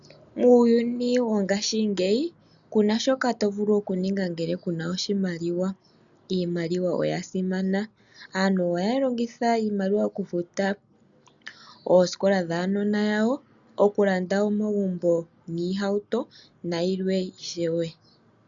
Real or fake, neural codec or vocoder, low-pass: real; none; 7.2 kHz